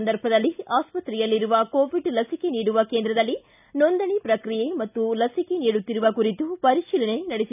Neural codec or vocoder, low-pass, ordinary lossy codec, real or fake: none; 3.6 kHz; none; real